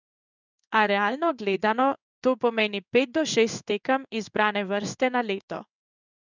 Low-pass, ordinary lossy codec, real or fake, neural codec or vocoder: 7.2 kHz; none; fake; codec, 16 kHz in and 24 kHz out, 1 kbps, XY-Tokenizer